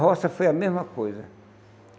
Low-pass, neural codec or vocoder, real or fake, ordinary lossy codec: none; none; real; none